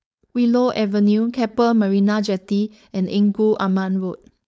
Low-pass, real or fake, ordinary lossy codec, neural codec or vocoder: none; fake; none; codec, 16 kHz, 4.8 kbps, FACodec